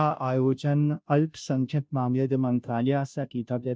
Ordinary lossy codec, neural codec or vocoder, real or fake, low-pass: none; codec, 16 kHz, 0.5 kbps, FunCodec, trained on Chinese and English, 25 frames a second; fake; none